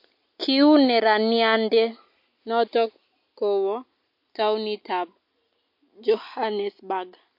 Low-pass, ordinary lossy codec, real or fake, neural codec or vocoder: 5.4 kHz; MP3, 32 kbps; real; none